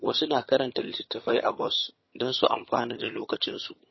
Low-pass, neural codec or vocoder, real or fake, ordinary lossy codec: 7.2 kHz; vocoder, 22.05 kHz, 80 mel bands, HiFi-GAN; fake; MP3, 24 kbps